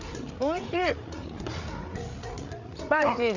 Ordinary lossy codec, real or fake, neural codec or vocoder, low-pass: none; fake; codec, 16 kHz, 8 kbps, FreqCodec, larger model; 7.2 kHz